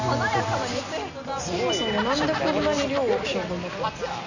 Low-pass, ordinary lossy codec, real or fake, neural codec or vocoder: 7.2 kHz; none; real; none